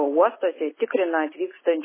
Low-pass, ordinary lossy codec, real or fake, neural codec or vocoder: 3.6 kHz; MP3, 16 kbps; real; none